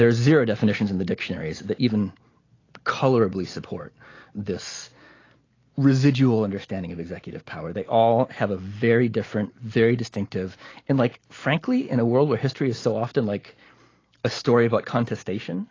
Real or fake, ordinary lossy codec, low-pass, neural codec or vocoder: real; AAC, 32 kbps; 7.2 kHz; none